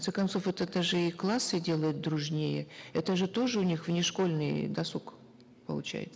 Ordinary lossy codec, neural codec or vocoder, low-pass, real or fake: none; none; none; real